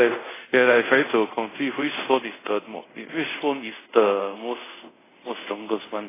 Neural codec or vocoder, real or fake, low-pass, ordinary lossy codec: codec, 24 kHz, 0.5 kbps, DualCodec; fake; 3.6 kHz; AAC, 16 kbps